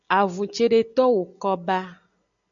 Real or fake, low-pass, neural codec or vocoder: real; 7.2 kHz; none